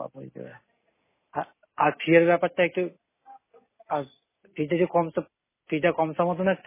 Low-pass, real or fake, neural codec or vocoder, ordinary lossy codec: 3.6 kHz; real; none; MP3, 16 kbps